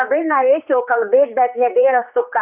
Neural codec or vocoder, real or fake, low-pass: codec, 16 kHz, 2 kbps, X-Codec, HuBERT features, trained on general audio; fake; 3.6 kHz